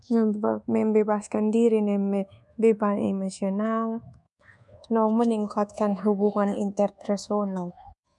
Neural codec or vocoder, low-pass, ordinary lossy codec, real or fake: codec, 24 kHz, 1.2 kbps, DualCodec; 10.8 kHz; none; fake